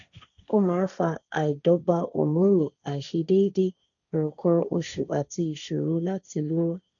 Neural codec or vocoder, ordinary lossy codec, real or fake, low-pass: codec, 16 kHz, 1.1 kbps, Voila-Tokenizer; none; fake; 7.2 kHz